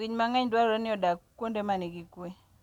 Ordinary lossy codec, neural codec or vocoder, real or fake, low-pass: none; none; real; 19.8 kHz